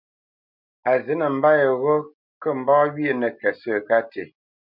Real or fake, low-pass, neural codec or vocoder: real; 5.4 kHz; none